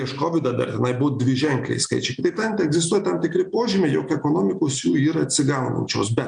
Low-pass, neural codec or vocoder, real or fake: 9.9 kHz; none; real